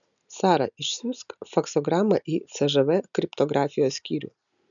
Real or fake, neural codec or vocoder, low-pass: real; none; 7.2 kHz